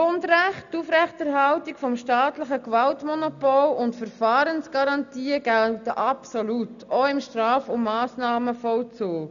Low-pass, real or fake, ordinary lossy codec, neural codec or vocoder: 7.2 kHz; real; none; none